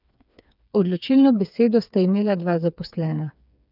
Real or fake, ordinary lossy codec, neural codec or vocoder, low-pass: fake; none; codec, 16 kHz, 4 kbps, FreqCodec, smaller model; 5.4 kHz